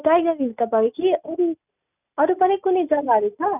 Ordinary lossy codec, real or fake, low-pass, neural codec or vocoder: none; real; 3.6 kHz; none